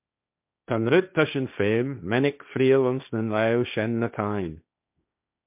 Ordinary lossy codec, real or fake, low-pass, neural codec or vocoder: MP3, 32 kbps; fake; 3.6 kHz; codec, 16 kHz, 1.1 kbps, Voila-Tokenizer